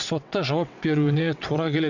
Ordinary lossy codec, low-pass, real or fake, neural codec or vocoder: none; 7.2 kHz; fake; vocoder, 22.05 kHz, 80 mel bands, WaveNeXt